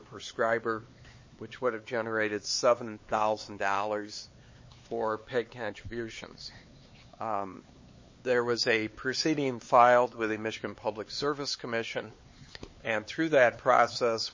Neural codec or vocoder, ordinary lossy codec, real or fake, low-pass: codec, 16 kHz, 4 kbps, X-Codec, HuBERT features, trained on LibriSpeech; MP3, 32 kbps; fake; 7.2 kHz